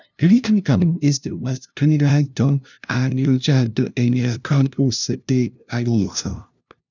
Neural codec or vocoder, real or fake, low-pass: codec, 16 kHz, 0.5 kbps, FunCodec, trained on LibriTTS, 25 frames a second; fake; 7.2 kHz